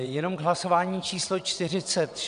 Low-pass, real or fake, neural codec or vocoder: 9.9 kHz; fake; vocoder, 22.05 kHz, 80 mel bands, WaveNeXt